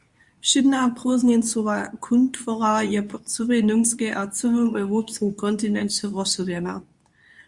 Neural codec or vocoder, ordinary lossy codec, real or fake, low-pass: codec, 24 kHz, 0.9 kbps, WavTokenizer, medium speech release version 2; Opus, 64 kbps; fake; 10.8 kHz